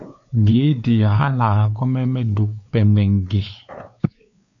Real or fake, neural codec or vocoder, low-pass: fake; codec, 16 kHz, 4 kbps, X-Codec, WavLM features, trained on Multilingual LibriSpeech; 7.2 kHz